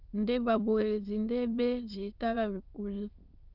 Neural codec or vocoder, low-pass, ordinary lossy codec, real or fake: autoencoder, 22.05 kHz, a latent of 192 numbers a frame, VITS, trained on many speakers; 5.4 kHz; Opus, 24 kbps; fake